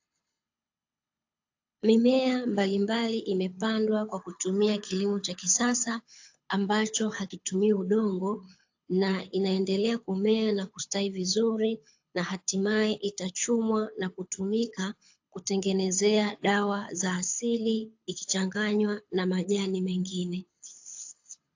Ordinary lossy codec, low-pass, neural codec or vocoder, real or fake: AAC, 48 kbps; 7.2 kHz; codec, 24 kHz, 6 kbps, HILCodec; fake